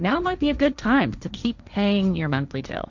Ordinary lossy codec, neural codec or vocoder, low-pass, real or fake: Opus, 64 kbps; codec, 16 kHz, 1.1 kbps, Voila-Tokenizer; 7.2 kHz; fake